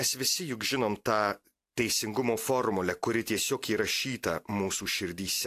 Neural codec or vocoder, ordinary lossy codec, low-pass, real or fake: none; AAC, 64 kbps; 14.4 kHz; real